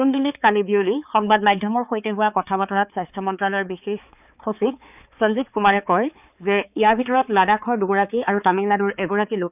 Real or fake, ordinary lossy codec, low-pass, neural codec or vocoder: fake; none; 3.6 kHz; codec, 16 kHz, 4 kbps, X-Codec, HuBERT features, trained on balanced general audio